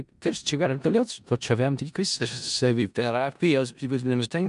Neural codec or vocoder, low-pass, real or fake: codec, 16 kHz in and 24 kHz out, 0.4 kbps, LongCat-Audio-Codec, four codebook decoder; 10.8 kHz; fake